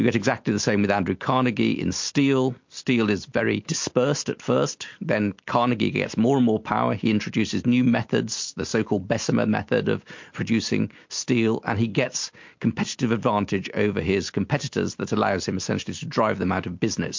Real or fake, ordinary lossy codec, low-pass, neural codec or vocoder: real; MP3, 48 kbps; 7.2 kHz; none